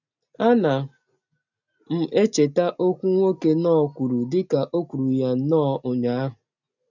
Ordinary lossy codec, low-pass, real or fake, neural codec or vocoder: none; 7.2 kHz; real; none